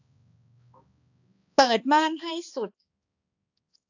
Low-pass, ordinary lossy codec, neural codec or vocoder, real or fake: 7.2 kHz; AAC, 48 kbps; codec, 16 kHz, 2 kbps, X-Codec, HuBERT features, trained on balanced general audio; fake